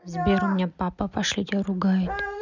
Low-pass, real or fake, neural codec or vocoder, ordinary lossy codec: 7.2 kHz; real; none; none